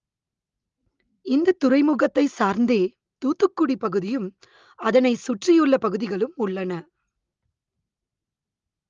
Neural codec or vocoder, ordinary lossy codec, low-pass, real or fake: none; Opus, 32 kbps; 7.2 kHz; real